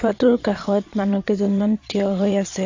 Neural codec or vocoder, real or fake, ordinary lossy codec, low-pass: vocoder, 22.05 kHz, 80 mel bands, Vocos; fake; AAC, 48 kbps; 7.2 kHz